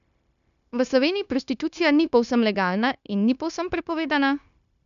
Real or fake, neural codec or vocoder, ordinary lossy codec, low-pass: fake; codec, 16 kHz, 0.9 kbps, LongCat-Audio-Codec; none; 7.2 kHz